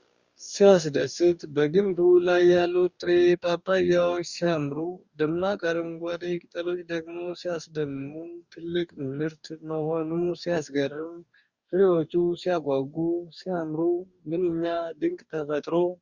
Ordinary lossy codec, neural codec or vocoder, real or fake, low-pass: Opus, 64 kbps; codec, 44.1 kHz, 2.6 kbps, DAC; fake; 7.2 kHz